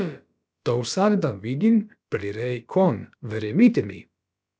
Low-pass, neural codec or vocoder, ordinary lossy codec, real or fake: none; codec, 16 kHz, about 1 kbps, DyCAST, with the encoder's durations; none; fake